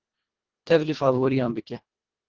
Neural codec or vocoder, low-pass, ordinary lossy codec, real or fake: codec, 24 kHz, 1.5 kbps, HILCodec; 7.2 kHz; Opus, 16 kbps; fake